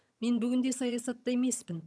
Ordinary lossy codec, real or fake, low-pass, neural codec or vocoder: none; fake; none; vocoder, 22.05 kHz, 80 mel bands, HiFi-GAN